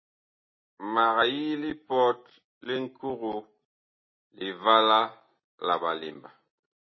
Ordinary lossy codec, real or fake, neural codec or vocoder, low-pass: MP3, 24 kbps; real; none; 7.2 kHz